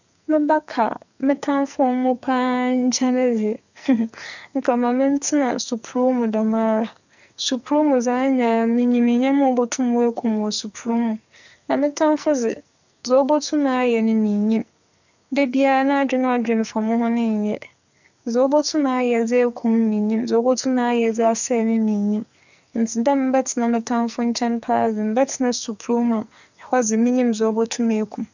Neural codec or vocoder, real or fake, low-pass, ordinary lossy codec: codec, 44.1 kHz, 2.6 kbps, SNAC; fake; 7.2 kHz; none